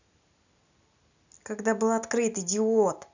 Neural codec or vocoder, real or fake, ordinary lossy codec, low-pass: none; real; none; 7.2 kHz